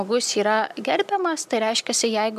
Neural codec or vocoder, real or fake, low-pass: none; real; 14.4 kHz